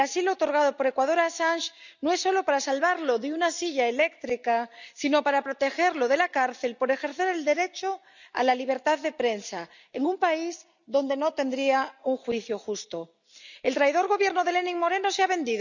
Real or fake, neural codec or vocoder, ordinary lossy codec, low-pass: real; none; none; 7.2 kHz